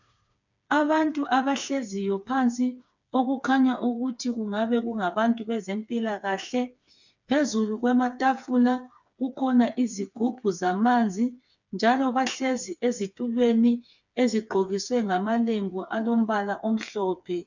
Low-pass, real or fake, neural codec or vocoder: 7.2 kHz; fake; codec, 16 kHz, 4 kbps, FreqCodec, smaller model